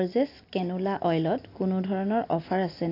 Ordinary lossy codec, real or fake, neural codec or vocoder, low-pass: AAC, 32 kbps; real; none; 5.4 kHz